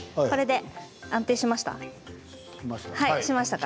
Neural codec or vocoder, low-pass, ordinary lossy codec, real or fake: none; none; none; real